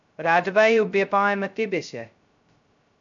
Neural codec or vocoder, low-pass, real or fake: codec, 16 kHz, 0.2 kbps, FocalCodec; 7.2 kHz; fake